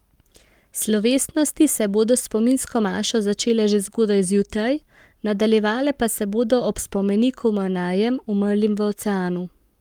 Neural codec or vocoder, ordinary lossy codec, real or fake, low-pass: codec, 44.1 kHz, 7.8 kbps, Pupu-Codec; Opus, 32 kbps; fake; 19.8 kHz